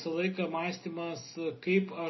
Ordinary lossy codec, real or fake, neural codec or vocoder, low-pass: MP3, 24 kbps; real; none; 7.2 kHz